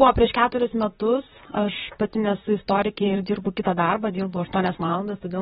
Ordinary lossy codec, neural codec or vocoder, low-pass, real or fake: AAC, 16 kbps; vocoder, 44.1 kHz, 128 mel bands, Pupu-Vocoder; 19.8 kHz; fake